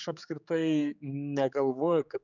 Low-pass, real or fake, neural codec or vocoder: 7.2 kHz; fake; codec, 16 kHz, 4 kbps, X-Codec, HuBERT features, trained on general audio